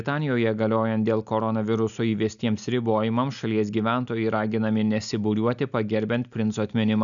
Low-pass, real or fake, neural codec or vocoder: 7.2 kHz; real; none